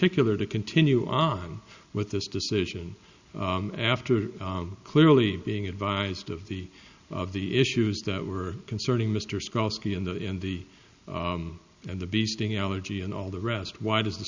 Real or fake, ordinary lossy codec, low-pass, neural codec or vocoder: real; Opus, 64 kbps; 7.2 kHz; none